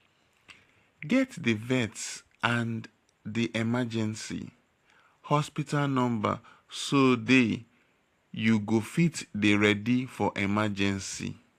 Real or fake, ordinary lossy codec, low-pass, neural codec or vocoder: fake; AAC, 64 kbps; 14.4 kHz; vocoder, 44.1 kHz, 128 mel bands every 512 samples, BigVGAN v2